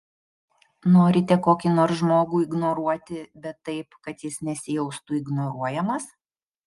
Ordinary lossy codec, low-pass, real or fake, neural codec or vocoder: Opus, 32 kbps; 10.8 kHz; real; none